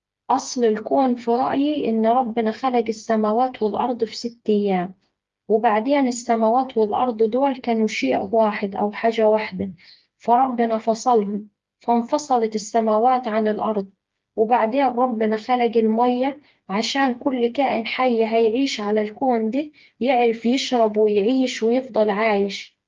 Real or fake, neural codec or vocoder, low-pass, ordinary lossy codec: fake; codec, 16 kHz, 4 kbps, FreqCodec, smaller model; 7.2 kHz; Opus, 32 kbps